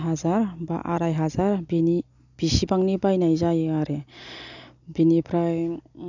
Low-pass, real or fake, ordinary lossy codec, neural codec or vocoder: 7.2 kHz; real; none; none